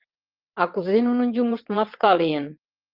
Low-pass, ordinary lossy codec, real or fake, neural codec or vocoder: 5.4 kHz; Opus, 32 kbps; fake; vocoder, 22.05 kHz, 80 mel bands, WaveNeXt